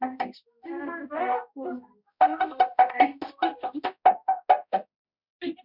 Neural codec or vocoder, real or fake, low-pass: codec, 16 kHz, 0.5 kbps, X-Codec, HuBERT features, trained on balanced general audio; fake; 5.4 kHz